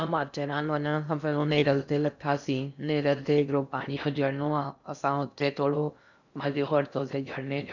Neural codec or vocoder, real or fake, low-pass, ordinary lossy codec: codec, 16 kHz in and 24 kHz out, 0.6 kbps, FocalCodec, streaming, 4096 codes; fake; 7.2 kHz; none